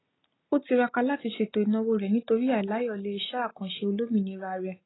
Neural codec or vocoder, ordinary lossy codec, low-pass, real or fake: none; AAC, 16 kbps; 7.2 kHz; real